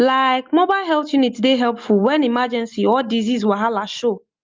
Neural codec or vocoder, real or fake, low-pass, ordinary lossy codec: none; real; 7.2 kHz; Opus, 24 kbps